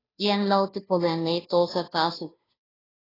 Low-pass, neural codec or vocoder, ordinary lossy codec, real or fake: 5.4 kHz; codec, 16 kHz, 0.5 kbps, FunCodec, trained on Chinese and English, 25 frames a second; AAC, 24 kbps; fake